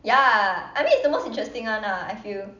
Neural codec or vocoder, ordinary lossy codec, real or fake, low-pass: none; none; real; 7.2 kHz